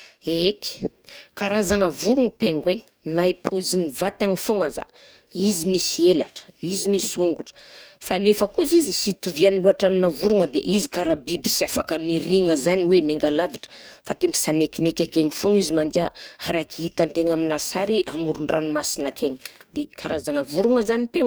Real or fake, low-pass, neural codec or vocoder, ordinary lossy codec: fake; none; codec, 44.1 kHz, 2.6 kbps, DAC; none